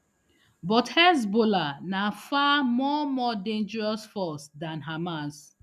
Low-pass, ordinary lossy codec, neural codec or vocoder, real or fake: 14.4 kHz; none; none; real